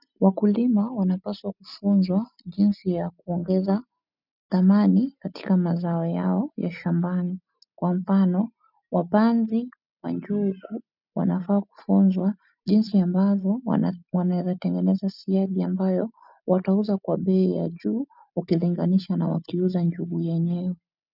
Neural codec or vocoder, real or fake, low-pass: none; real; 5.4 kHz